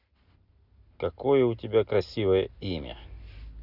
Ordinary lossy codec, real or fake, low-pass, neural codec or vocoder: none; real; 5.4 kHz; none